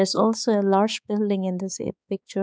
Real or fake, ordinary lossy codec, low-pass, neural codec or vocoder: fake; none; none; codec, 16 kHz, 4 kbps, X-Codec, HuBERT features, trained on balanced general audio